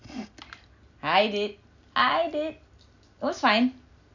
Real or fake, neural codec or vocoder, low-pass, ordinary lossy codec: real; none; 7.2 kHz; none